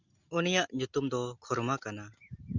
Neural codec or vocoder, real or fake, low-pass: none; real; 7.2 kHz